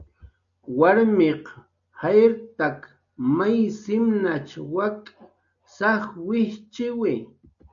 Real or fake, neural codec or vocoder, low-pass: real; none; 7.2 kHz